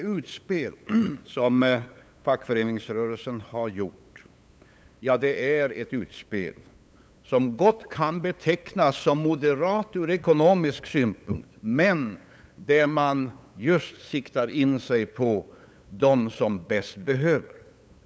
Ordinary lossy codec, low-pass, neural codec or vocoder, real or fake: none; none; codec, 16 kHz, 8 kbps, FunCodec, trained on LibriTTS, 25 frames a second; fake